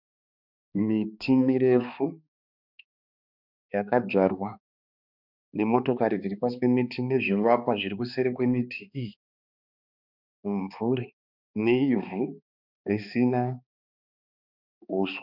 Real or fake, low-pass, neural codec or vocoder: fake; 5.4 kHz; codec, 16 kHz, 2 kbps, X-Codec, HuBERT features, trained on balanced general audio